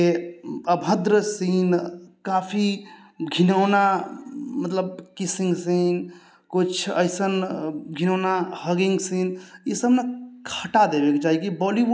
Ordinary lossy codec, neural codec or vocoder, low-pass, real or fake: none; none; none; real